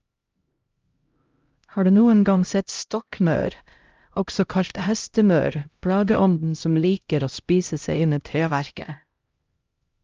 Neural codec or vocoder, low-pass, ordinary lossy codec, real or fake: codec, 16 kHz, 0.5 kbps, X-Codec, HuBERT features, trained on LibriSpeech; 7.2 kHz; Opus, 24 kbps; fake